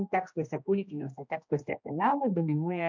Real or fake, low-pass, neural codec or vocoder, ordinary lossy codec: fake; 7.2 kHz; codec, 16 kHz, 1 kbps, X-Codec, HuBERT features, trained on general audio; MP3, 48 kbps